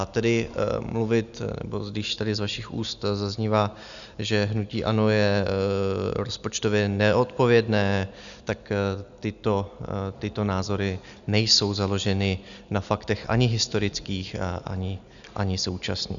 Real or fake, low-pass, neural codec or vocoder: real; 7.2 kHz; none